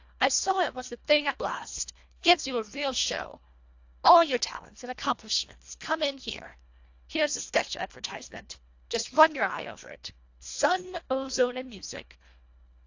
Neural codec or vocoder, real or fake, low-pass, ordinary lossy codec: codec, 24 kHz, 1.5 kbps, HILCodec; fake; 7.2 kHz; AAC, 48 kbps